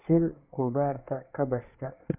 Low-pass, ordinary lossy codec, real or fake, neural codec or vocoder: 3.6 kHz; none; fake; codec, 24 kHz, 1 kbps, SNAC